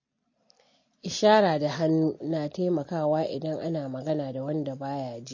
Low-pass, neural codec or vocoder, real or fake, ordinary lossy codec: 7.2 kHz; none; real; MP3, 32 kbps